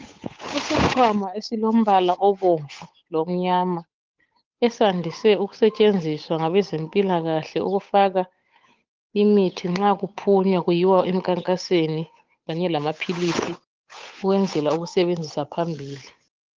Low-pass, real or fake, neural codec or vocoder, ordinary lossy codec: 7.2 kHz; fake; codec, 16 kHz, 8 kbps, FunCodec, trained on Chinese and English, 25 frames a second; Opus, 32 kbps